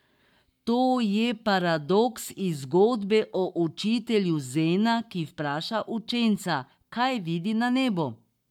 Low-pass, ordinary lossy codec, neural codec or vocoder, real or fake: 19.8 kHz; none; codec, 44.1 kHz, 7.8 kbps, Pupu-Codec; fake